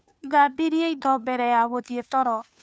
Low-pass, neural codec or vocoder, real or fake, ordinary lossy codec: none; codec, 16 kHz, 2 kbps, FunCodec, trained on Chinese and English, 25 frames a second; fake; none